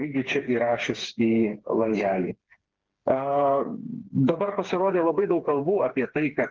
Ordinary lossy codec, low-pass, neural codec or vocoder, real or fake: Opus, 16 kbps; 7.2 kHz; codec, 16 kHz, 4 kbps, FreqCodec, smaller model; fake